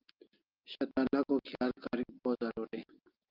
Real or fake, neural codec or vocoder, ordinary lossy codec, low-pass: real; none; Opus, 24 kbps; 5.4 kHz